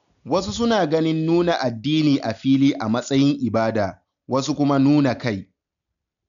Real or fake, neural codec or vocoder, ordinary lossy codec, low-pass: real; none; none; 7.2 kHz